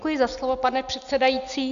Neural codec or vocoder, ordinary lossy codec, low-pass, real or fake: none; AAC, 96 kbps; 7.2 kHz; real